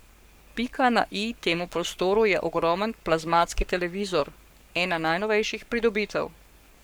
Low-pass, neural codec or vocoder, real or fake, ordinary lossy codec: none; codec, 44.1 kHz, 7.8 kbps, Pupu-Codec; fake; none